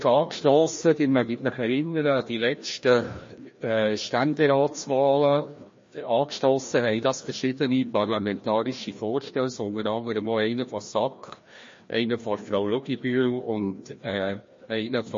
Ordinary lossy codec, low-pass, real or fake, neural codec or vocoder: MP3, 32 kbps; 7.2 kHz; fake; codec, 16 kHz, 1 kbps, FreqCodec, larger model